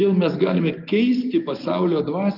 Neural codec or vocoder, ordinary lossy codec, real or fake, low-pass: none; Opus, 24 kbps; real; 5.4 kHz